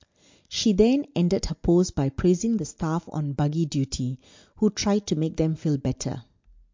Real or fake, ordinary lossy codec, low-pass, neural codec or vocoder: real; MP3, 48 kbps; 7.2 kHz; none